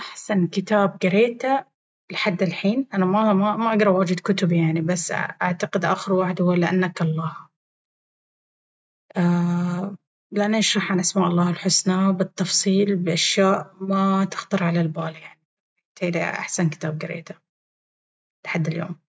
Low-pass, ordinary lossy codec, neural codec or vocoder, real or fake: none; none; none; real